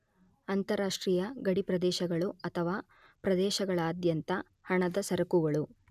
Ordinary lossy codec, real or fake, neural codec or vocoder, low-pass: none; real; none; 14.4 kHz